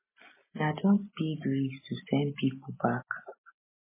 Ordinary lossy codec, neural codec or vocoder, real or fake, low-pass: MP3, 16 kbps; none; real; 3.6 kHz